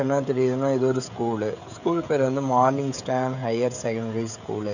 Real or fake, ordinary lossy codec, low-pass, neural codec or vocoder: fake; none; 7.2 kHz; codec, 16 kHz, 16 kbps, FreqCodec, smaller model